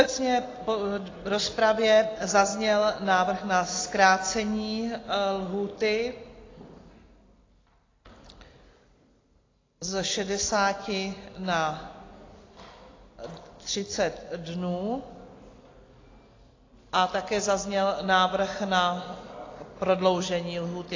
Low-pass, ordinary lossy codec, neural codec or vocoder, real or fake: 7.2 kHz; AAC, 32 kbps; none; real